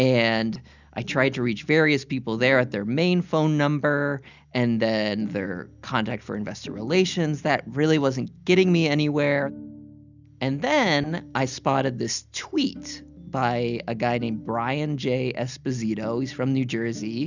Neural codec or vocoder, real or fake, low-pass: none; real; 7.2 kHz